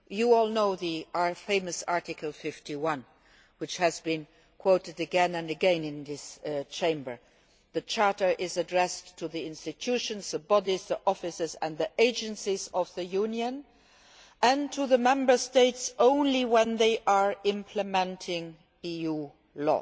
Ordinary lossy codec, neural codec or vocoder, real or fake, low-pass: none; none; real; none